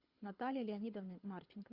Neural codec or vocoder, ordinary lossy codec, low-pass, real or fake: codec, 24 kHz, 6 kbps, HILCodec; Opus, 64 kbps; 5.4 kHz; fake